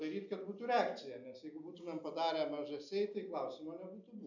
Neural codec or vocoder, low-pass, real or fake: none; 7.2 kHz; real